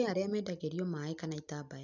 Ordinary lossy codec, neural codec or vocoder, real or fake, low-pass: none; none; real; 7.2 kHz